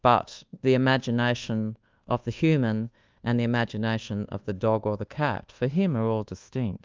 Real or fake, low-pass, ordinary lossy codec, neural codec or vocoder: fake; 7.2 kHz; Opus, 32 kbps; codec, 24 kHz, 1.2 kbps, DualCodec